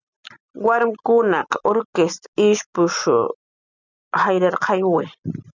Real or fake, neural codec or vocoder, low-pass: real; none; 7.2 kHz